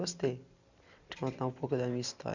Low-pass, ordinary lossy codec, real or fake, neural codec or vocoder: 7.2 kHz; none; real; none